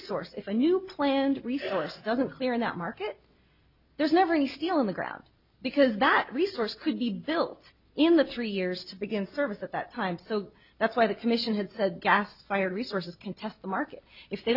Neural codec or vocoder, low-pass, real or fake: none; 5.4 kHz; real